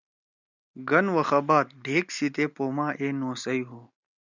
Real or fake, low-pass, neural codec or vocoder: real; 7.2 kHz; none